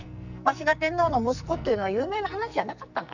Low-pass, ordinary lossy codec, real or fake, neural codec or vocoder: 7.2 kHz; none; fake; codec, 44.1 kHz, 2.6 kbps, SNAC